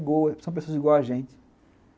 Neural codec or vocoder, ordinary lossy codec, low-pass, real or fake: none; none; none; real